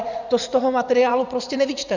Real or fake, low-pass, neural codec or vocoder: fake; 7.2 kHz; vocoder, 44.1 kHz, 80 mel bands, Vocos